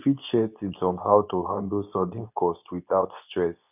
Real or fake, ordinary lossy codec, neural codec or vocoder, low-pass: fake; none; codec, 24 kHz, 0.9 kbps, WavTokenizer, medium speech release version 2; 3.6 kHz